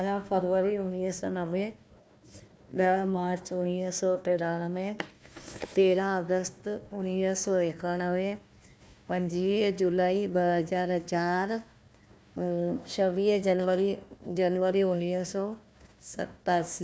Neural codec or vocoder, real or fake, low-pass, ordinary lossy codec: codec, 16 kHz, 1 kbps, FunCodec, trained on Chinese and English, 50 frames a second; fake; none; none